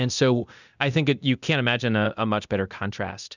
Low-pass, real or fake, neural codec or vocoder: 7.2 kHz; fake; codec, 24 kHz, 0.9 kbps, DualCodec